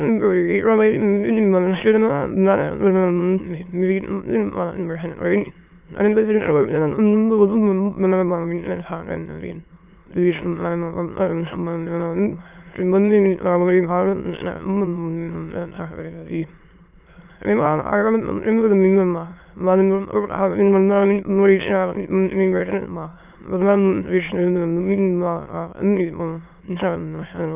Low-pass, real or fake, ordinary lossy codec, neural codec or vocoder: 3.6 kHz; fake; none; autoencoder, 22.05 kHz, a latent of 192 numbers a frame, VITS, trained on many speakers